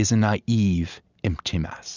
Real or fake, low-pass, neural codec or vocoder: real; 7.2 kHz; none